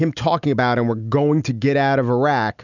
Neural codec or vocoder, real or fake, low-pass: none; real; 7.2 kHz